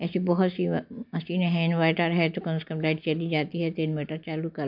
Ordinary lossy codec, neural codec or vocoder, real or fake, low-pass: MP3, 48 kbps; none; real; 5.4 kHz